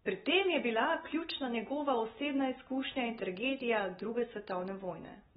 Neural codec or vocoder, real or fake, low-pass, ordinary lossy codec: none; real; 10.8 kHz; AAC, 16 kbps